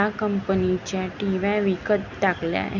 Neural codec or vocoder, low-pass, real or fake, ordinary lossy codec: none; 7.2 kHz; real; none